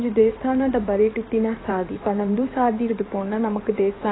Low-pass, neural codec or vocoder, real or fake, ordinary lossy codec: 7.2 kHz; codec, 16 kHz in and 24 kHz out, 2.2 kbps, FireRedTTS-2 codec; fake; AAC, 16 kbps